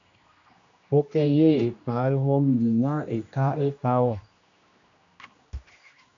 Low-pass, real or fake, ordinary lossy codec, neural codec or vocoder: 7.2 kHz; fake; MP3, 96 kbps; codec, 16 kHz, 1 kbps, X-Codec, HuBERT features, trained on general audio